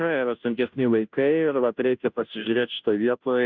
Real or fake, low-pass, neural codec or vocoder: fake; 7.2 kHz; codec, 16 kHz, 0.5 kbps, FunCodec, trained on Chinese and English, 25 frames a second